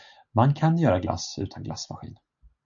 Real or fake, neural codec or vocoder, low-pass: real; none; 7.2 kHz